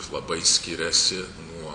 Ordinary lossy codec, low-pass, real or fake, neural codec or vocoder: Opus, 64 kbps; 9.9 kHz; real; none